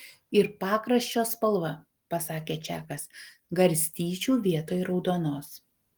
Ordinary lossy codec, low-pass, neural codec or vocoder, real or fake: Opus, 24 kbps; 19.8 kHz; none; real